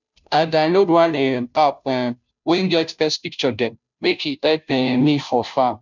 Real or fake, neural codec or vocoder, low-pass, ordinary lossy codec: fake; codec, 16 kHz, 0.5 kbps, FunCodec, trained on Chinese and English, 25 frames a second; 7.2 kHz; none